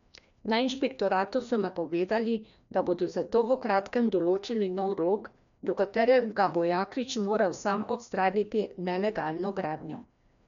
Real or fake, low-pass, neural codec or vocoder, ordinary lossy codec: fake; 7.2 kHz; codec, 16 kHz, 1 kbps, FreqCodec, larger model; none